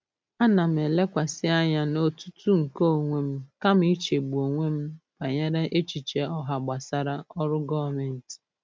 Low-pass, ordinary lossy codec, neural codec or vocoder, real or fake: none; none; none; real